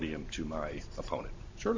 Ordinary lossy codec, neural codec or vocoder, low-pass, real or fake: MP3, 64 kbps; vocoder, 44.1 kHz, 128 mel bands every 512 samples, BigVGAN v2; 7.2 kHz; fake